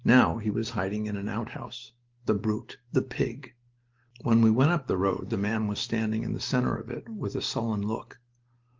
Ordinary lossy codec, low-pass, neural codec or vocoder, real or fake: Opus, 16 kbps; 7.2 kHz; none; real